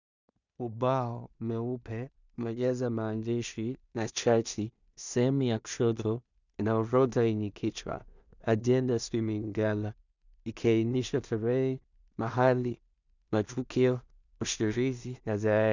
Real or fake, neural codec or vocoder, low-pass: fake; codec, 16 kHz in and 24 kHz out, 0.4 kbps, LongCat-Audio-Codec, two codebook decoder; 7.2 kHz